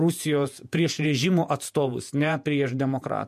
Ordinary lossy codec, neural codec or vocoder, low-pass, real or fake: MP3, 64 kbps; vocoder, 48 kHz, 128 mel bands, Vocos; 14.4 kHz; fake